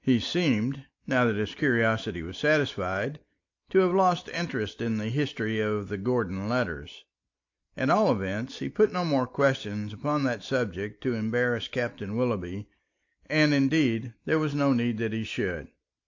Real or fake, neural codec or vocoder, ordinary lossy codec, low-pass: real; none; AAC, 48 kbps; 7.2 kHz